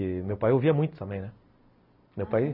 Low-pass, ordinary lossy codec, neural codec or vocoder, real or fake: 5.4 kHz; none; none; real